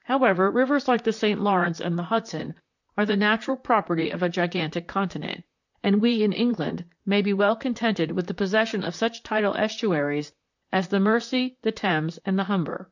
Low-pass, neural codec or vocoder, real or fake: 7.2 kHz; vocoder, 44.1 kHz, 128 mel bands, Pupu-Vocoder; fake